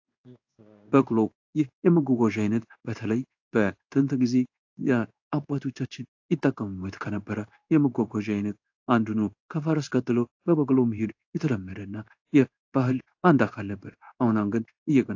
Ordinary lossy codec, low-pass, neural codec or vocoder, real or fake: AAC, 48 kbps; 7.2 kHz; codec, 16 kHz in and 24 kHz out, 1 kbps, XY-Tokenizer; fake